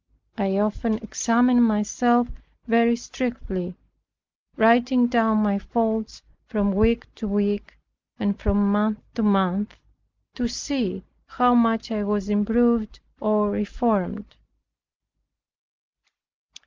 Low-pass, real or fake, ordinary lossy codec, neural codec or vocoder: 7.2 kHz; real; Opus, 24 kbps; none